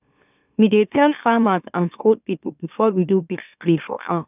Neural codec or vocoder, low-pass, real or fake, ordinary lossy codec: autoencoder, 44.1 kHz, a latent of 192 numbers a frame, MeloTTS; 3.6 kHz; fake; none